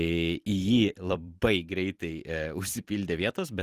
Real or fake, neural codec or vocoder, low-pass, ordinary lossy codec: fake; vocoder, 44.1 kHz, 128 mel bands every 256 samples, BigVGAN v2; 14.4 kHz; Opus, 24 kbps